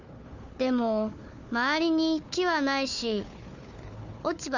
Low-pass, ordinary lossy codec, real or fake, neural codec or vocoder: 7.2 kHz; Opus, 64 kbps; fake; codec, 16 kHz, 4 kbps, FunCodec, trained on Chinese and English, 50 frames a second